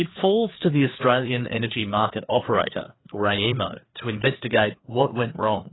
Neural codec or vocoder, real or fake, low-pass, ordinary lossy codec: codec, 16 kHz, 2 kbps, FreqCodec, larger model; fake; 7.2 kHz; AAC, 16 kbps